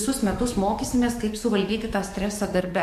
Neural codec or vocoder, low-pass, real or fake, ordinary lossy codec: codec, 44.1 kHz, 7.8 kbps, DAC; 14.4 kHz; fake; MP3, 64 kbps